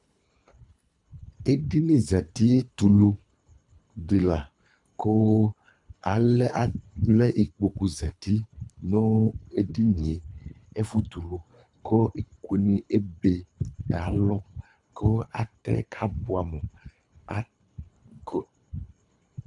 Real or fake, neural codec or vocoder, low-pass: fake; codec, 24 kHz, 3 kbps, HILCodec; 10.8 kHz